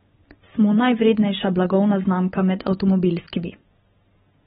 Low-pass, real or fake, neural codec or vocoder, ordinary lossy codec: 19.8 kHz; real; none; AAC, 16 kbps